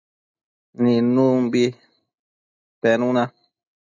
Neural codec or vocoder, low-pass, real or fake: none; 7.2 kHz; real